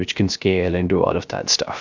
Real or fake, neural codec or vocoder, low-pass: fake; codec, 16 kHz, 0.3 kbps, FocalCodec; 7.2 kHz